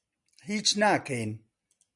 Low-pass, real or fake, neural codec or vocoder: 10.8 kHz; real; none